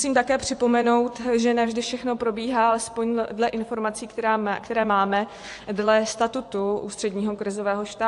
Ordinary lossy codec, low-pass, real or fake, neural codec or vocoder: AAC, 64 kbps; 10.8 kHz; fake; vocoder, 24 kHz, 100 mel bands, Vocos